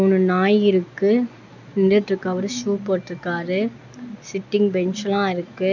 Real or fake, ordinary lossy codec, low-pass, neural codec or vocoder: real; none; 7.2 kHz; none